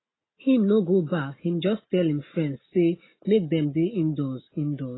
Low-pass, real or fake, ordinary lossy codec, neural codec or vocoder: 7.2 kHz; real; AAC, 16 kbps; none